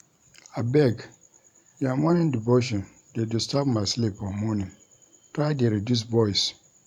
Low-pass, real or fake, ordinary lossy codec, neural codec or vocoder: 19.8 kHz; fake; MP3, 96 kbps; vocoder, 44.1 kHz, 128 mel bands every 512 samples, BigVGAN v2